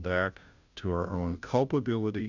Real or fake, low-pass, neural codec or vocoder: fake; 7.2 kHz; codec, 16 kHz, 0.5 kbps, FunCodec, trained on Chinese and English, 25 frames a second